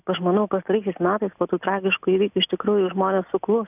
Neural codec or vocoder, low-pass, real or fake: none; 3.6 kHz; real